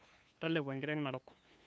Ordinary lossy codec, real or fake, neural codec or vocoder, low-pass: none; fake; codec, 16 kHz, 2 kbps, FunCodec, trained on LibriTTS, 25 frames a second; none